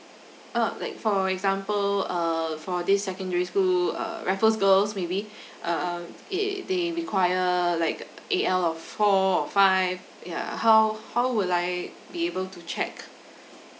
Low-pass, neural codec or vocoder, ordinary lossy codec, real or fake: none; none; none; real